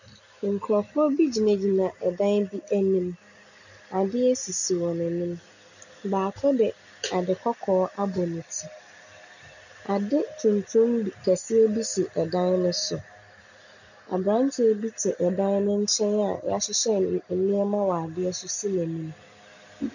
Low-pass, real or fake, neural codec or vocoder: 7.2 kHz; real; none